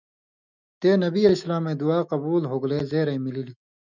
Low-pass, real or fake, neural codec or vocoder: 7.2 kHz; fake; autoencoder, 48 kHz, 128 numbers a frame, DAC-VAE, trained on Japanese speech